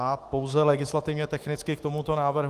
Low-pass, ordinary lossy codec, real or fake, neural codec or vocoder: 14.4 kHz; Opus, 24 kbps; fake; autoencoder, 48 kHz, 128 numbers a frame, DAC-VAE, trained on Japanese speech